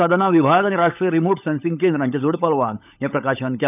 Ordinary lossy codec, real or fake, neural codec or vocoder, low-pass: none; fake; codec, 16 kHz, 16 kbps, FunCodec, trained on LibriTTS, 50 frames a second; 3.6 kHz